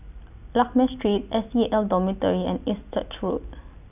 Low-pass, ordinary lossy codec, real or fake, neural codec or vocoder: 3.6 kHz; none; real; none